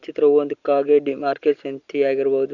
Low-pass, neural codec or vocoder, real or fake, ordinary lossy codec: 7.2 kHz; none; real; Opus, 64 kbps